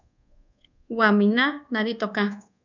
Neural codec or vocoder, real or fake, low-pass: codec, 24 kHz, 1.2 kbps, DualCodec; fake; 7.2 kHz